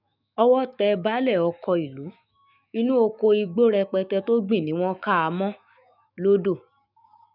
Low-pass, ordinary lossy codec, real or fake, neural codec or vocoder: 5.4 kHz; none; fake; autoencoder, 48 kHz, 128 numbers a frame, DAC-VAE, trained on Japanese speech